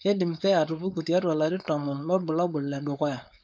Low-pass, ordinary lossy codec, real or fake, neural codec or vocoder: none; none; fake; codec, 16 kHz, 4.8 kbps, FACodec